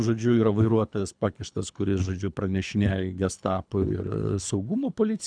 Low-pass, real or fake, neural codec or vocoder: 9.9 kHz; fake; codec, 24 kHz, 3 kbps, HILCodec